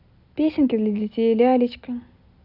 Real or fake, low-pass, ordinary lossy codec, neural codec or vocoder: real; 5.4 kHz; none; none